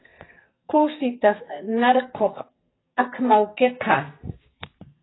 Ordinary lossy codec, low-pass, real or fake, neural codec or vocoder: AAC, 16 kbps; 7.2 kHz; fake; codec, 44.1 kHz, 2.6 kbps, SNAC